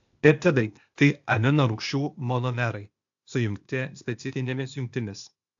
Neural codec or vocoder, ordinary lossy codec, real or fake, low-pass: codec, 16 kHz, 0.8 kbps, ZipCodec; AAC, 64 kbps; fake; 7.2 kHz